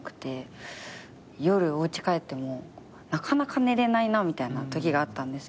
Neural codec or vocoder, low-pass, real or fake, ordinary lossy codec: none; none; real; none